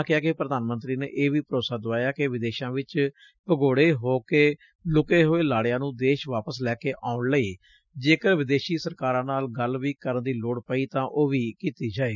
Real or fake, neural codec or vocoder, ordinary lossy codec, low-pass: real; none; none; 7.2 kHz